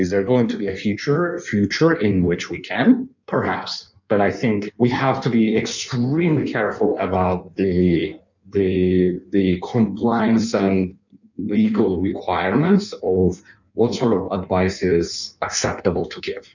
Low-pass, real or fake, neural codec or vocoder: 7.2 kHz; fake; codec, 16 kHz in and 24 kHz out, 1.1 kbps, FireRedTTS-2 codec